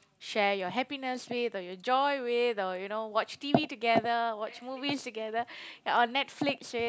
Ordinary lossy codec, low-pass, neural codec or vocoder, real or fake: none; none; none; real